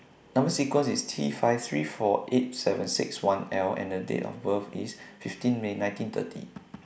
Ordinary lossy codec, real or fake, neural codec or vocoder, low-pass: none; real; none; none